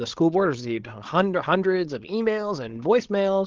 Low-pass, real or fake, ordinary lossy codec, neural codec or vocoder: 7.2 kHz; fake; Opus, 16 kbps; codec, 16 kHz, 16 kbps, FreqCodec, larger model